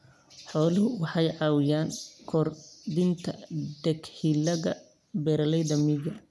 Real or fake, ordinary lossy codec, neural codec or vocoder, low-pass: real; none; none; none